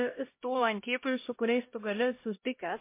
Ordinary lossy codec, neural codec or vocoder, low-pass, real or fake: MP3, 24 kbps; codec, 16 kHz, 0.5 kbps, X-Codec, HuBERT features, trained on LibriSpeech; 3.6 kHz; fake